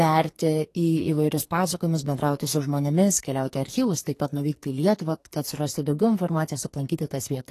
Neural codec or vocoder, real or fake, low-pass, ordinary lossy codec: codec, 44.1 kHz, 2.6 kbps, SNAC; fake; 14.4 kHz; AAC, 48 kbps